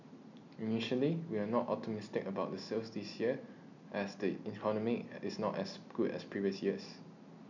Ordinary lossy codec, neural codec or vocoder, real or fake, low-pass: none; none; real; 7.2 kHz